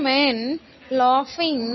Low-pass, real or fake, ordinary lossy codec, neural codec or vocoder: 7.2 kHz; real; MP3, 24 kbps; none